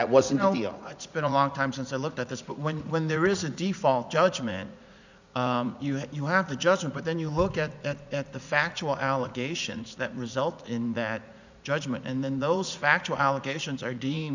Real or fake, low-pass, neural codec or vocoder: fake; 7.2 kHz; vocoder, 44.1 kHz, 80 mel bands, Vocos